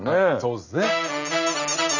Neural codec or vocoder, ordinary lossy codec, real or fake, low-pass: none; none; real; 7.2 kHz